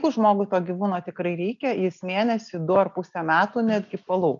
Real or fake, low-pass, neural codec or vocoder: real; 7.2 kHz; none